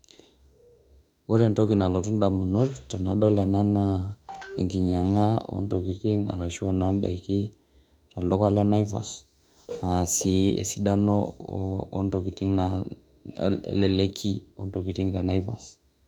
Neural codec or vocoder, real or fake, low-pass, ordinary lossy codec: autoencoder, 48 kHz, 32 numbers a frame, DAC-VAE, trained on Japanese speech; fake; 19.8 kHz; none